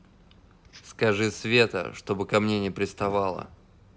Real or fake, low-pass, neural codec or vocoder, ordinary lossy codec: real; none; none; none